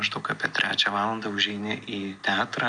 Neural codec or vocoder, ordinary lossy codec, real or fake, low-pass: none; MP3, 96 kbps; real; 9.9 kHz